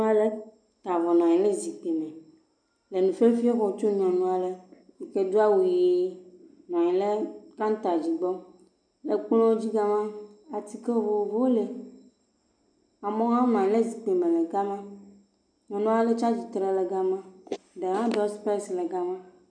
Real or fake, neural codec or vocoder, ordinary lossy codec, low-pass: real; none; MP3, 96 kbps; 9.9 kHz